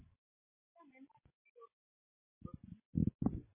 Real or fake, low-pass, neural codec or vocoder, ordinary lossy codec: real; 3.6 kHz; none; MP3, 16 kbps